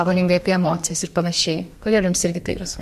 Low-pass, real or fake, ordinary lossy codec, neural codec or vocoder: 14.4 kHz; fake; MP3, 64 kbps; codec, 32 kHz, 1.9 kbps, SNAC